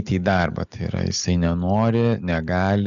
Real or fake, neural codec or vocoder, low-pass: real; none; 7.2 kHz